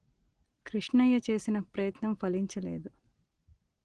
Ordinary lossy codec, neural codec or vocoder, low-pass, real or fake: Opus, 16 kbps; none; 9.9 kHz; real